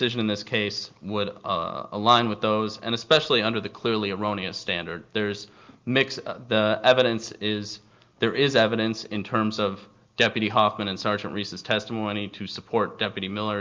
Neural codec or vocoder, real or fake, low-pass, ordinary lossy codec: none; real; 7.2 kHz; Opus, 24 kbps